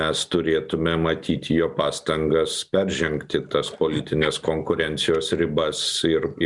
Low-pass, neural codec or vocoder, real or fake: 10.8 kHz; none; real